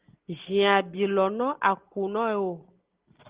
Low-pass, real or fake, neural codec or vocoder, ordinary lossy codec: 3.6 kHz; real; none; Opus, 16 kbps